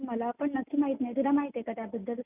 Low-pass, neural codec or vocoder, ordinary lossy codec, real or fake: 3.6 kHz; none; none; real